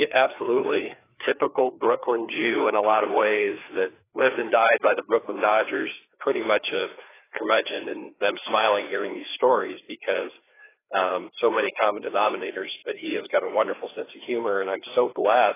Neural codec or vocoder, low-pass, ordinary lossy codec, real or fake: codec, 16 kHz, 2 kbps, FreqCodec, larger model; 3.6 kHz; AAC, 16 kbps; fake